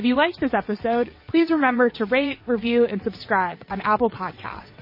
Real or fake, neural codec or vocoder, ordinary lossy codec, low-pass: fake; vocoder, 22.05 kHz, 80 mel bands, WaveNeXt; MP3, 24 kbps; 5.4 kHz